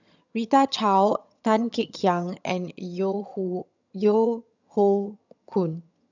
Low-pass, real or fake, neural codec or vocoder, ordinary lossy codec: 7.2 kHz; fake; vocoder, 22.05 kHz, 80 mel bands, HiFi-GAN; none